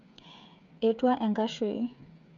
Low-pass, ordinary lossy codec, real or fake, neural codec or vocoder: 7.2 kHz; MP3, 64 kbps; fake; codec, 16 kHz, 8 kbps, FreqCodec, smaller model